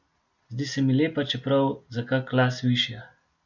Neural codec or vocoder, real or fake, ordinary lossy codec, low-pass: none; real; none; 7.2 kHz